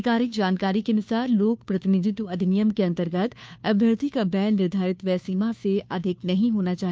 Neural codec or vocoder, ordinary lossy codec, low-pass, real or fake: codec, 16 kHz, 2 kbps, FunCodec, trained on Chinese and English, 25 frames a second; none; none; fake